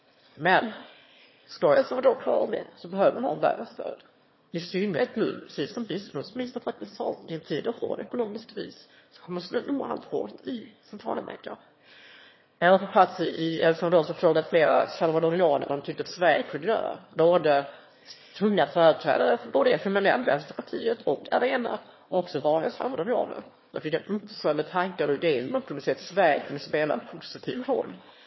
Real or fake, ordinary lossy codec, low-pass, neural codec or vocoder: fake; MP3, 24 kbps; 7.2 kHz; autoencoder, 22.05 kHz, a latent of 192 numbers a frame, VITS, trained on one speaker